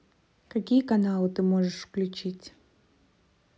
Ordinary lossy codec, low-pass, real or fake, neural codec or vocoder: none; none; real; none